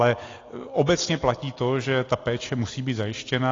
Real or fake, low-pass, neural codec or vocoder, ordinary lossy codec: real; 7.2 kHz; none; AAC, 32 kbps